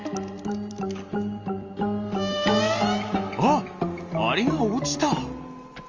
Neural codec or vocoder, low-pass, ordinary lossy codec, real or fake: none; 7.2 kHz; Opus, 32 kbps; real